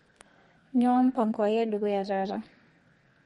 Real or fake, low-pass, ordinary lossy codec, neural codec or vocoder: fake; 14.4 kHz; MP3, 48 kbps; codec, 32 kHz, 1.9 kbps, SNAC